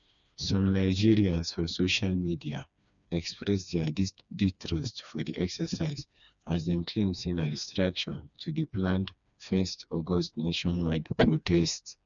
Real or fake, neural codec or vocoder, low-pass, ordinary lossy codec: fake; codec, 16 kHz, 2 kbps, FreqCodec, smaller model; 7.2 kHz; none